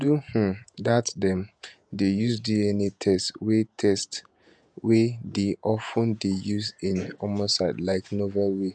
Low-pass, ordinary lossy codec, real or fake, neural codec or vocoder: 9.9 kHz; none; fake; vocoder, 48 kHz, 128 mel bands, Vocos